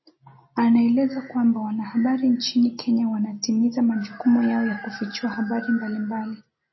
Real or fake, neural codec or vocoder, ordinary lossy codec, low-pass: real; none; MP3, 24 kbps; 7.2 kHz